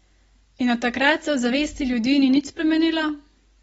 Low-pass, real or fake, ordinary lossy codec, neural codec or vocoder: 19.8 kHz; real; AAC, 24 kbps; none